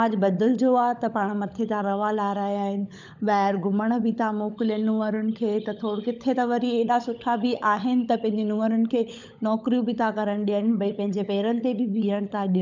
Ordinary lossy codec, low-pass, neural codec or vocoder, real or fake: none; 7.2 kHz; codec, 16 kHz, 16 kbps, FunCodec, trained on LibriTTS, 50 frames a second; fake